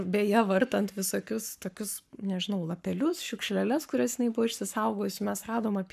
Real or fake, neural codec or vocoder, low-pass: fake; codec, 44.1 kHz, 7.8 kbps, Pupu-Codec; 14.4 kHz